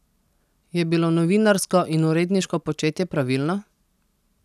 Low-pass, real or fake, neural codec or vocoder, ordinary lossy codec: 14.4 kHz; real; none; none